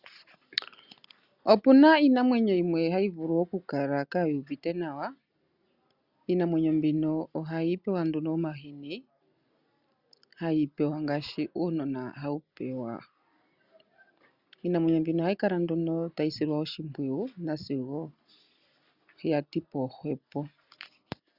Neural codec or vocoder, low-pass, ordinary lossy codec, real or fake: none; 5.4 kHz; Opus, 64 kbps; real